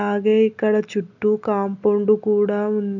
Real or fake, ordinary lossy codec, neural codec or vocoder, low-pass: real; none; none; 7.2 kHz